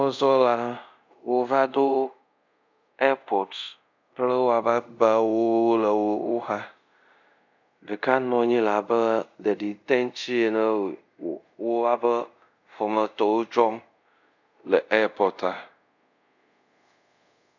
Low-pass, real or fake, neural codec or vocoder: 7.2 kHz; fake; codec, 24 kHz, 0.5 kbps, DualCodec